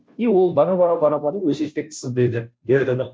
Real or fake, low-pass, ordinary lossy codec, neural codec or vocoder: fake; none; none; codec, 16 kHz, 0.5 kbps, FunCodec, trained on Chinese and English, 25 frames a second